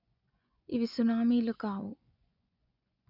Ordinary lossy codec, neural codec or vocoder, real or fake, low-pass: none; vocoder, 24 kHz, 100 mel bands, Vocos; fake; 5.4 kHz